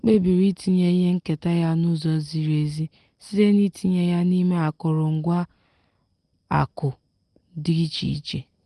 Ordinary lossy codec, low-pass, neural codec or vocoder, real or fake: Opus, 24 kbps; 10.8 kHz; none; real